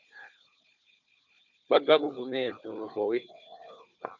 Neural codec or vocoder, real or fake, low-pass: codec, 16 kHz, 4 kbps, FunCodec, trained on Chinese and English, 50 frames a second; fake; 7.2 kHz